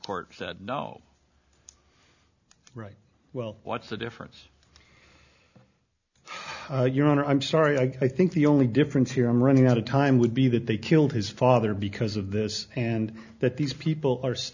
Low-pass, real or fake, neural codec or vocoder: 7.2 kHz; real; none